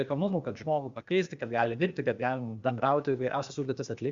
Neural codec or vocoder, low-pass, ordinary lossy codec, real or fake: codec, 16 kHz, 0.8 kbps, ZipCodec; 7.2 kHz; Opus, 64 kbps; fake